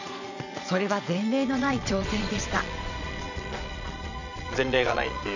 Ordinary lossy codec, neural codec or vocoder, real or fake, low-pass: none; vocoder, 22.05 kHz, 80 mel bands, Vocos; fake; 7.2 kHz